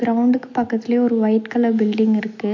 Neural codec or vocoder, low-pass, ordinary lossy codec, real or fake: none; 7.2 kHz; MP3, 48 kbps; real